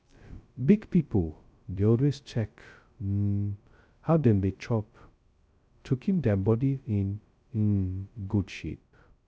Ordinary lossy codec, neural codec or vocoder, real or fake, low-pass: none; codec, 16 kHz, 0.2 kbps, FocalCodec; fake; none